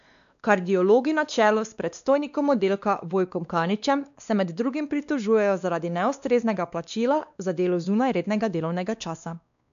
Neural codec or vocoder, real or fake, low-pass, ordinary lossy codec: codec, 16 kHz, 2 kbps, X-Codec, WavLM features, trained on Multilingual LibriSpeech; fake; 7.2 kHz; none